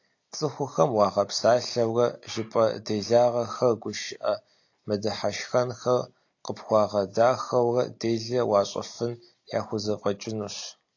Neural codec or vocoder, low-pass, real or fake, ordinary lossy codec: none; 7.2 kHz; real; AAC, 48 kbps